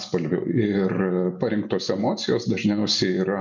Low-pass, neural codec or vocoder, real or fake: 7.2 kHz; none; real